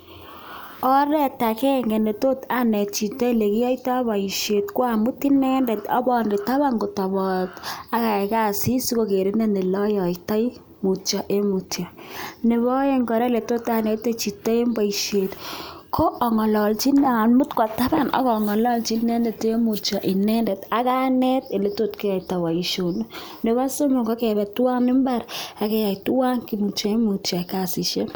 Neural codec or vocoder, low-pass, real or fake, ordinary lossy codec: none; none; real; none